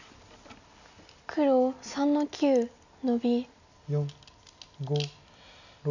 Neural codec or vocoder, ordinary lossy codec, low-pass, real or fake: none; none; 7.2 kHz; real